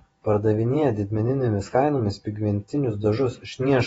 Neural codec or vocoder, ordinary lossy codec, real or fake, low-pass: vocoder, 48 kHz, 128 mel bands, Vocos; AAC, 24 kbps; fake; 19.8 kHz